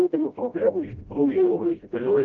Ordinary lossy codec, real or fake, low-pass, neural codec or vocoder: Opus, 24 kbps; fake; 7.2 kHz; codec, 16 kHz, 0.5 kbps, FreqCodec, smaller model